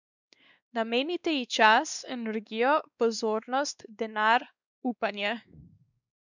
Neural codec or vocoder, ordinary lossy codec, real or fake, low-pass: codec, 16 kHz, 2 kbps, X-Codec, WavLM features, trained on Multilingual LibriSpeech; none; fake; 7.2 kHz